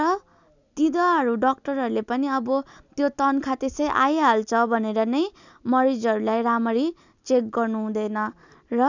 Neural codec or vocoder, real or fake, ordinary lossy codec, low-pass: none; real; none; 7.2 kHz